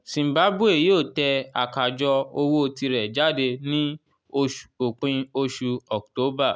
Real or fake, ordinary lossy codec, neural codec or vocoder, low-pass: real; none; none; none